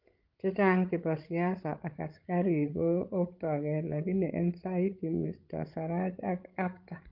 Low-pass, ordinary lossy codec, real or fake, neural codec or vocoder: 5.4 kHz; Opus, 32 kbps; fake; codec, 16 kHz, 16 kbps, FreqCodec, larger model